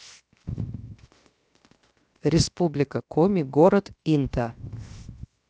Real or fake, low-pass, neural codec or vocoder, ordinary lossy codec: fake; none; codec, 16 kHz, 0.7 kbps, FocalCodec; none